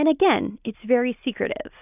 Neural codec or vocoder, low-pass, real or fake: none; 3.6 kHz; real